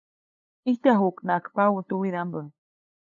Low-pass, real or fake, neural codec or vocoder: 7.2 kHz; fake; codec, 16 kHz, 8 kbps, FunCodec, trained on LibriTTS, 25 frames a second